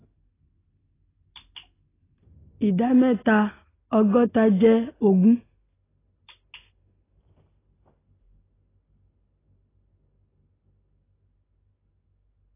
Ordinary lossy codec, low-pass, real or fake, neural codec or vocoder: AAC, 16 kbps; 3.6 kHz; real; none